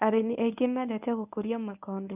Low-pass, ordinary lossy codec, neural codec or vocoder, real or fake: 3.6 kHz; none; codec, 24 kHz, 0.9 kbps, WavTokenizer, medium speech release version 1; fake